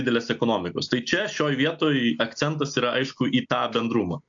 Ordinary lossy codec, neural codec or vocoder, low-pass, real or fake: AAC, 64 kbps; none; 7.2 kHz; real